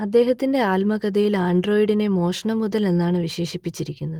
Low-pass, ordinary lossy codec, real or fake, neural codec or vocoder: 19.8 kHz; Opus, 24 kbps; real; none